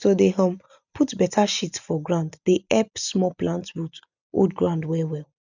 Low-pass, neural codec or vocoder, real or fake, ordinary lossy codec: 7.2 kHz; none; real; none